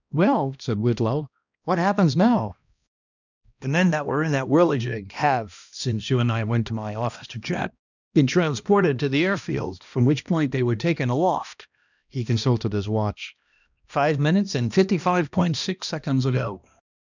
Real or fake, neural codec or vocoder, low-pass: fake; codec, 16 kHz, 1 kbps, X-Codec, HuBERT features, trained on balanced general audio; 7.2 kHz